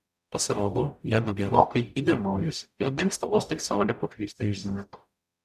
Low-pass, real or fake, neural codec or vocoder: 14.4 kHz; fake; codec, 44.1 kHz, 0.9 kbps, DAC